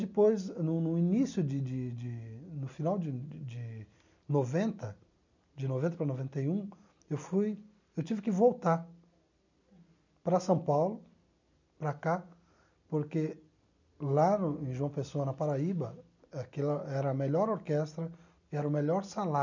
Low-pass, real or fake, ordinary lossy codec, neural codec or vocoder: 7.2 kHz; real; none; none